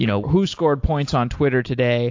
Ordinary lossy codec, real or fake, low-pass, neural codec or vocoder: AAC, 48 kbps; real; 7.2 kHz; none